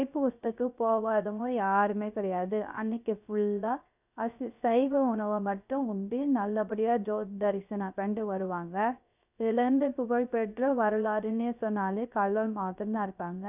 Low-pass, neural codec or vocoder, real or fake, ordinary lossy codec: 3.6 kHz; codec, 16 kHz, 0.3 kbps, FocalCodec; fake; none